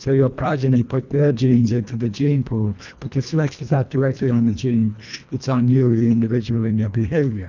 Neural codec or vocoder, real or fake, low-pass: codec, 24 kHz, 1.5 kbps, HILCodec; fake; 7.2 kHz